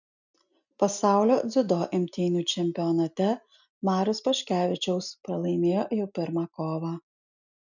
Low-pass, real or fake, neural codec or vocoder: 7.2 kHz; real; none